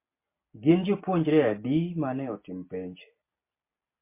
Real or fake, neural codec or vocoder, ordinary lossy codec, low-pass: real; none; MP3, 24 kbps; 3.6 kHz